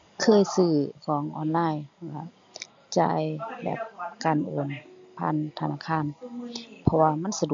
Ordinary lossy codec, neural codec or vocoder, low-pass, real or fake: AAC, 64 kbps; none; 7.2 kHz; real